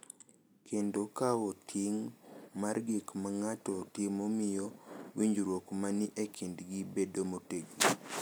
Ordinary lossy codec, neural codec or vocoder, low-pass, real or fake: none; none; none; real